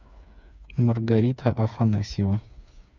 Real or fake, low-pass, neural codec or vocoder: fake; 7.2 kHz; codec, 16 kHz, 4 kbps, FreqCodec, smaller model